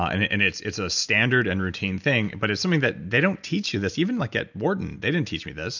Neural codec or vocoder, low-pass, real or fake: none; 7.2 kHz; real